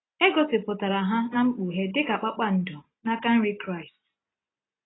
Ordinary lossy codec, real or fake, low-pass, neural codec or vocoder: AAC, 16 kbps; real; 7.2 kHz; none